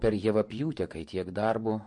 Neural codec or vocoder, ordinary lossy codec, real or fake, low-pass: vocoder, 24 kHz, 100 mel bands, Vocos; MP3, 48 kbps; fake; 10.8 kHz